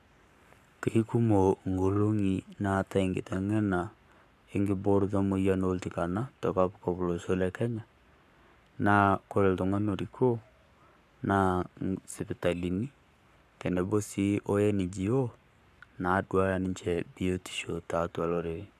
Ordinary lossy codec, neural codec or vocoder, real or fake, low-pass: none; codec, 44.1 kHz, 7.8 kbps, Pupu-Codec; fake; 14.4 kHz